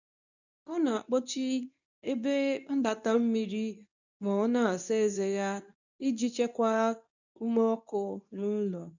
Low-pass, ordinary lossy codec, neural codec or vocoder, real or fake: 7.2 kHz; none; codec, 24 kHz, 0.9 kbps, WavTokenizer, medium speech release version 2; fake